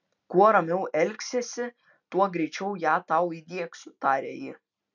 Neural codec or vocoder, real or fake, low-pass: none; real; 7.2 kHz